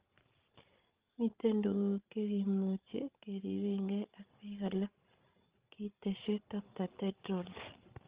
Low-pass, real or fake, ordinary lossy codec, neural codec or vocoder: 3.6 kHz; fake; Opus, 32 kbps; codec, 16 kHz, 16 kbps, FreqCodec, larger model